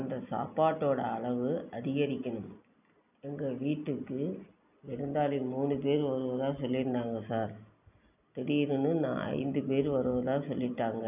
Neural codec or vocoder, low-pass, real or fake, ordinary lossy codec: none; 3.6 kHz; real; none